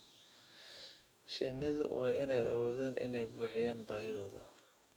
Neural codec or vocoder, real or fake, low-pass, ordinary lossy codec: codec, 44.1 kHz, 2.6 kbps, DAC; fake; 19.8 kHz; none